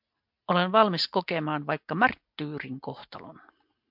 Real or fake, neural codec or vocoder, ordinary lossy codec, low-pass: real; none; MP3, 48 kbps; 5.4 kHz